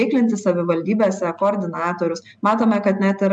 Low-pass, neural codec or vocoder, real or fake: 9.9 kHz; none; real